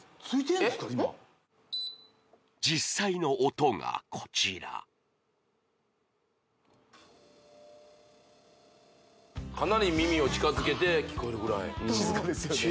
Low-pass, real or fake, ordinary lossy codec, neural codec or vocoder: none; real; none; none